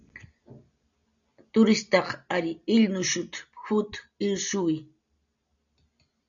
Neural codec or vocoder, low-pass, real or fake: none; 7.2 kHz; real